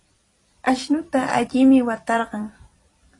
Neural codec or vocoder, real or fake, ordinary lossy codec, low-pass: vocoder, 44.1 kHz, 128 mel bands every 256 samples, BigVGAN v2; fake; AAC, 32 kbps; 10.8 kHz